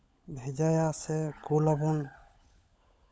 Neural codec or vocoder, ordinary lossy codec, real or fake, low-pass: codec, 16 kHz, 16 kbps, FunCodec, trained on LibriTTS, 50 frames a second; none; fake; none